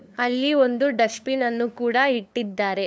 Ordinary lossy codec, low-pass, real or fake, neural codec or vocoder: none; none; fake; codec, 16 kHz, 2 kbps, FunCodec, trained on LibriTTS, 25 frames a second